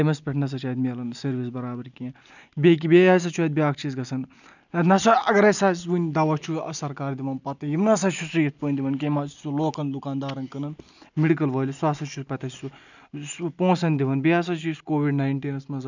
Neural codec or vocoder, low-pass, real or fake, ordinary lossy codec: none; 7.2 kHz; real; none